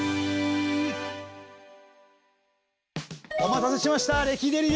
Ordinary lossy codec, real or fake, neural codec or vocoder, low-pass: none; real; none; none